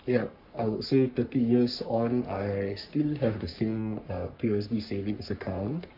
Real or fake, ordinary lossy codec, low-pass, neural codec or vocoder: fake; none; 5.4 kHz; codec, 44.1 kHz, 3.4 kbps, Pupu-Codec